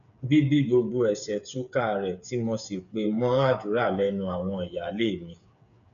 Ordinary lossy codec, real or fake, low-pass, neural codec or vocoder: none; fake; 7.2 kHz; codec, 16 kHz, 8 kbps, FreqCodec, smaller model